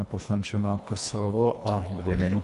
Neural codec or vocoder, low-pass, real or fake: codec, 24 kHz, 1.5 kbps, HILCodec; 10.8 kHz; fake